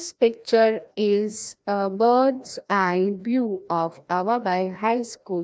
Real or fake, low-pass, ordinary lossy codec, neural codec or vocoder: fake; none; none; codec, 16 kHz, 1 kbps, FreqCodec, larger model